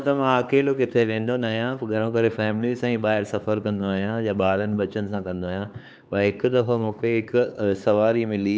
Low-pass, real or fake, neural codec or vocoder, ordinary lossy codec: none; fake; codec, 16 kHz, 4 kbps, X-Codec, HuBERT features, trained on LibriSpeech; none